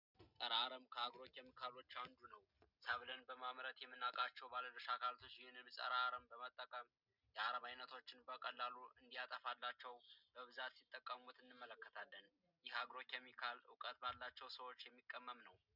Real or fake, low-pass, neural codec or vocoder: real; 5.4 kHz; none